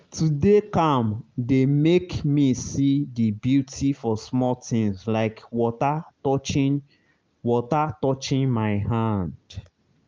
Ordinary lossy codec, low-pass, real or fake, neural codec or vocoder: Opus, 24 kbps; 7.2 kHz; real; none